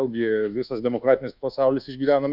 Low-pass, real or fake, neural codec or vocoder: 5.4 kHz; fake; codec, 24 kHz, 1.2 kbps, DualCodec